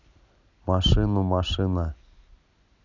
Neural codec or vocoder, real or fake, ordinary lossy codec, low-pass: none; real; none; 7.2 kHz